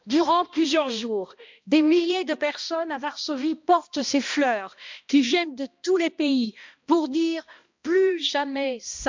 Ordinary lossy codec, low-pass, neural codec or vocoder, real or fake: MP3, 64 kbps; 7.2 kHz; codec, 16 kHz, 1 kbps, X-Codec, HuBERT features, trained on balanced general audio; fake